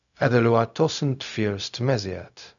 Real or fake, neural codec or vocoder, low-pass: fake; codec, 16 kHz, 0.4 kbps, LongCat-Audio-Codec; 7.2 kHz